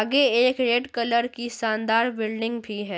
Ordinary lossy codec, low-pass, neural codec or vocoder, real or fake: none; none; none; real